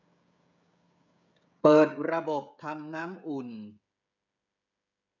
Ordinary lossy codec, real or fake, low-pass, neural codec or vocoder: none; fake; 7.2 kHz; codec, 16 kHz, 16 kbps, FreqCodec, smaller model